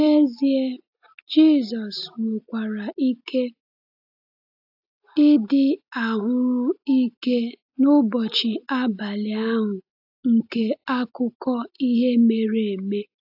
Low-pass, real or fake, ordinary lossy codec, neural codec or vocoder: 5.4 kHz; real; AAC, 48 kbps; none